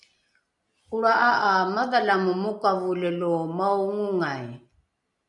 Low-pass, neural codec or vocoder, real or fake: 10.8 kHz; none; real